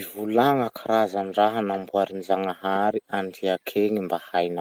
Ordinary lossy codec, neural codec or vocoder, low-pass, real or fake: Opus, 24 kbps; none; 19.8 kHz; real